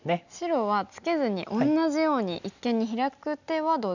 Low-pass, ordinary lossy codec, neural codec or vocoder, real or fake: 7.2 kHz; none; none; real